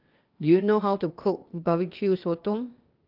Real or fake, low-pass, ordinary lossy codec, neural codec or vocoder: fake; 5.4 kHz; Opus, 32 kbps; codec, 16 kHz, 0.8 kbps, ZipCodec